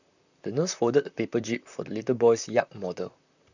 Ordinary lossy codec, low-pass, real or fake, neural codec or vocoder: none; 7.2 kHz; fake; vocoder, 44.1 kHz, 128 mel bands, Pupu-Vocoder